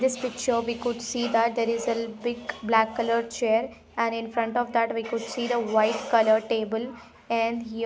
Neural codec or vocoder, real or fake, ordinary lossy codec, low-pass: none; real; none; none